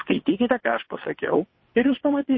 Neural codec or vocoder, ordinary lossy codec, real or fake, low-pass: vocoder, 22.05 kHz, 80 mel bands, WaveNeXt; MP3, 24 kbps; fake; 7.2 kHz